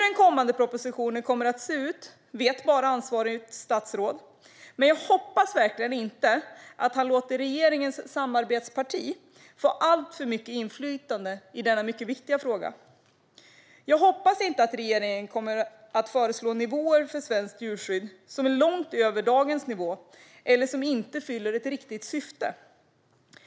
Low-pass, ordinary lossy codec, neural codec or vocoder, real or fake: none; none; none; real